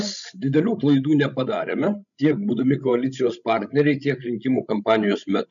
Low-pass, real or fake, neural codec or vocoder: 7.2 kHz; fake; codec, 16 kHz, 16 kbps, FreqCodec, larger model